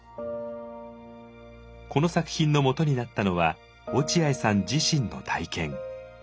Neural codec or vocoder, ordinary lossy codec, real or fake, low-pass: none; none; real; none